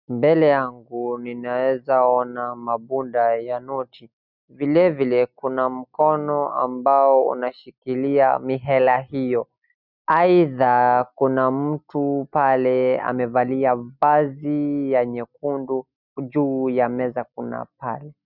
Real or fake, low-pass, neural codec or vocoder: real; 5.4 kHz; none